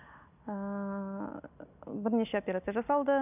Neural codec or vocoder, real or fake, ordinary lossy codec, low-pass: none; real; none; 3.6 kHz